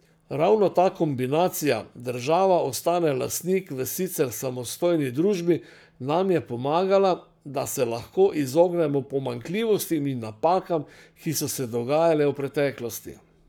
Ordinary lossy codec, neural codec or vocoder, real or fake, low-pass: none; codec, 44.1 kHz, 7.8 kbps, Pupu-Codec; fake; none